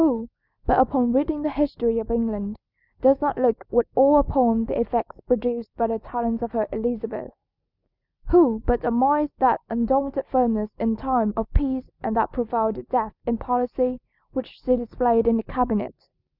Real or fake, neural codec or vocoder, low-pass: real; none; 5.4 kHz